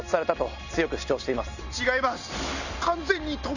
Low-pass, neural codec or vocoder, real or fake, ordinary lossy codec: 7.2 kHz; none; real; none